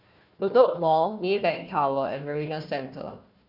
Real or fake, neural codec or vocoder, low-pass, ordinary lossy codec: fake; codec, 16 kHz, 1 kbps, FunCodec, trained on Chinese and English, 50 frames a second; 5.4 kHz; none